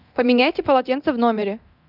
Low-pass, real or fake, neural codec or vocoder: 5.4 kHz; fake; codec, 24 kHz, 0.9 kbps, DualCodec